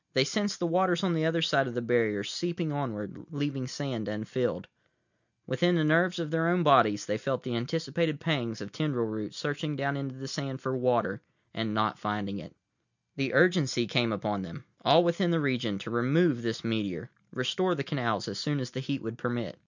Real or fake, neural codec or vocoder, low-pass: real; none; 7.2 kHz